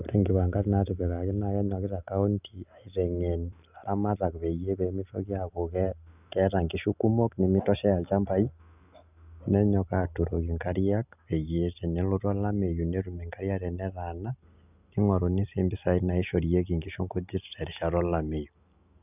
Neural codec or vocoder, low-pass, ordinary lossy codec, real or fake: none; 3.6 kHz; none; real